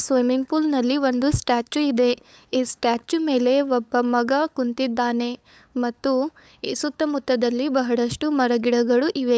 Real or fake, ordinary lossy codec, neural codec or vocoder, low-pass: fake; none; codec, 16 kHz, 8 kbps, FunCodec, trained on LibriTTS, 25 frames a second; none